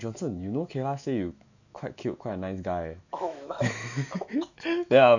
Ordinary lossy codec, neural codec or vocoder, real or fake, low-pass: none; autoencoder, 48 kHz, 128 numbers a frame, DAC-VAE, trained on Japanese speech; fake; 7.2 kHz